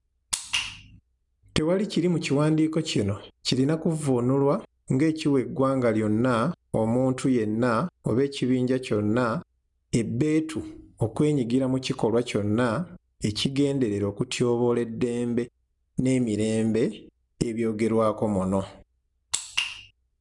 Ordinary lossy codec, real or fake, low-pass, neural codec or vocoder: none; real; 10.8 kHz; none